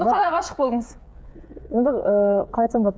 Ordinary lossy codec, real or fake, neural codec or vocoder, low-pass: none; fake; codec, 16 kHz, 8 kbps, FreqCodec, smaller model; none